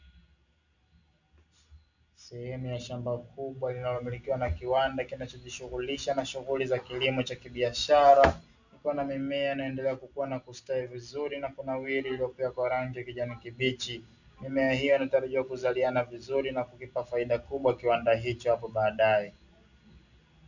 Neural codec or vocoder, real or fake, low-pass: none; real; 7.2 kHz